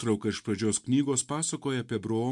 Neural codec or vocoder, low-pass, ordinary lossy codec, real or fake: none; 10.8 kHz; MP3, 64 kbps; real